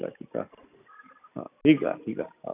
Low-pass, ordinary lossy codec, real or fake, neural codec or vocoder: 3.6 kHz; none; real; none